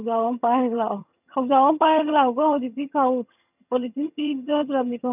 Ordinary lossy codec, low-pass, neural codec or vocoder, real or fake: none; 3.6 kHz; vocoder, 22.05 kHz, 80 mel bands, HiFi-GAN; fake